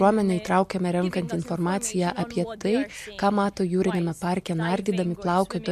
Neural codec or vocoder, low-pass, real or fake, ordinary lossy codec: none; 14.4 kHz; real; MP3, 64 kbps